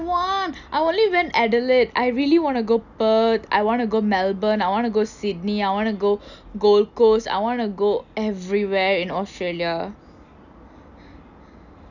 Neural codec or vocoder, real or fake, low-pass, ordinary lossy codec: none; real; 7.2 kHz; none